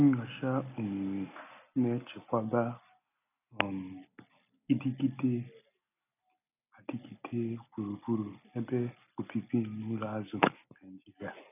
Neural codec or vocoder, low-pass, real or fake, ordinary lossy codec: none; 3.6 kHz; real; none